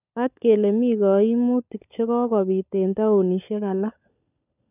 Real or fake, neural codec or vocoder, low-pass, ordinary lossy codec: fake; codec, 16 kHz, 16 kbps, FunCodec, trained on LibriTTS, 50 frames a second; 3.6 kHz; none